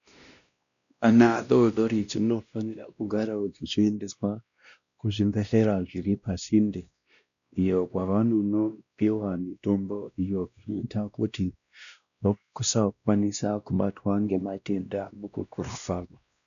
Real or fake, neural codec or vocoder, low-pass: fake; codec, 16 kHz, 1 kbps, X-Codec, WavLM features, trained on Multilingual LibriSpeech; 7.2 kHz